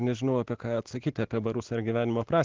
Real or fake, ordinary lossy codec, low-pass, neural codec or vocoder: real; Opus, 16 kbps; 7.2 kHz; none